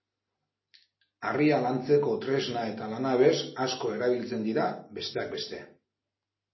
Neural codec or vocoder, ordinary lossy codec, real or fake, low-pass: none; MP3, 24 kbps; real; 7.2 kHz